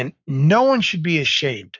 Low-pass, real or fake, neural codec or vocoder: 7.2 kHz; fake; codec, 44.1 kHz, 7.8 kbps, Pupu-Codec